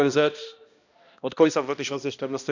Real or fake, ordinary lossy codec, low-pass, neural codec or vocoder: fake; none; 7.2 kHz; codec, 16 kHz, 1 kbps, X-Codec, HuBERT features, trained on balanced general audio